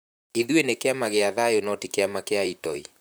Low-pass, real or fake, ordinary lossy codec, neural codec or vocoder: none; fake; none; vocoder, 44.1 kHz, 128 mel bands, Pupu-Vocoder